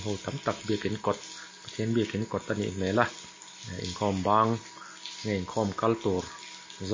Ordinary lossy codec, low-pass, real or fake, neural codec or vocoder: MP3, 32 kbps; 7.2 kHz; real; none